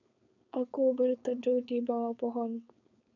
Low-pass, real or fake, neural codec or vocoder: 7.2 kHz; fake; codec, 16 kHz, 4.8 kbps, FACodec